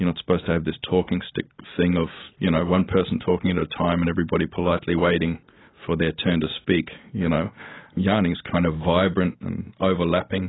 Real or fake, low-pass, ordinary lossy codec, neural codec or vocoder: real; 7.2 kHz; AAC, 16 kbps; none